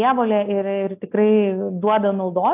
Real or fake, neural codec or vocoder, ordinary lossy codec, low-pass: real; none; AAC, 32 kbps; 3.6 kHz